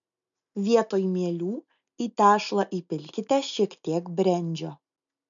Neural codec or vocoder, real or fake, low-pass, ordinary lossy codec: none; real; 7.2 kHz; MP3, 96 kbps